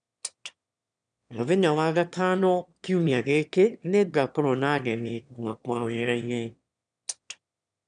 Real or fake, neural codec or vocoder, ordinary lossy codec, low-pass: fake; autoencoder, 22.05 kHz, a latent of 192 numbers a frame, VITS, trained on one speaker; none; 9.9 kHz